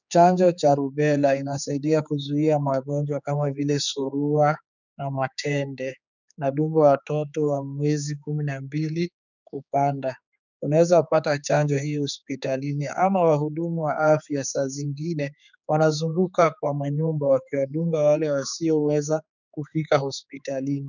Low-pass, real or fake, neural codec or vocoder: 7.2 kHz; fake; codec, 16 kHz, 4 kbps, X-Codec, HuBERT features, trained on general audio